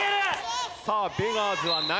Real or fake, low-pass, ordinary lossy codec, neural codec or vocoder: real; none; none; none